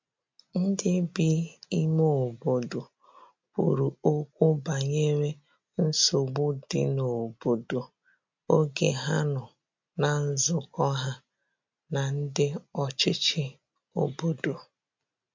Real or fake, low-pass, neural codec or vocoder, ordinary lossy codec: real; 7.2 kHz; none; MP3, 48 kbps